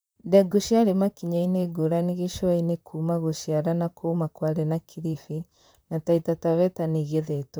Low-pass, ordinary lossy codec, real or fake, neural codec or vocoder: none; none; fake; vocoder, 44.1 kHz, 128 mel bands, Pupu-Vocoder